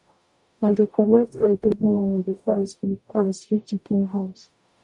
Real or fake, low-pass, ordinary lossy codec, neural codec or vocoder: fake; 10.8 kHz; none; codec, 44.1 kHz, 0.9 kbps, DAC